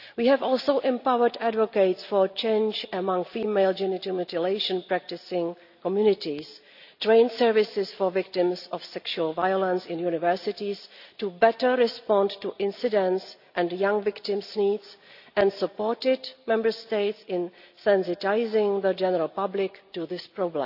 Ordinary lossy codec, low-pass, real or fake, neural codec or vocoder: none; 5.4 kHz; real; none